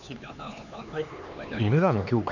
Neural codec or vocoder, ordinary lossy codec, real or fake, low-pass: codec, 16 kHz, 4 kbps, X-Codec, HuBERT features, trained on LibriSpeech; none; fake; 7.2 kHz